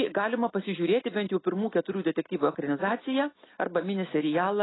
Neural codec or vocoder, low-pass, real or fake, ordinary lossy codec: none; 7.2 kHz; real; AAC, 16 kbps